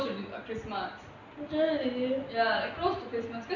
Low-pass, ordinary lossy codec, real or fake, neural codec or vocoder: 7.2 kHz; none; real; none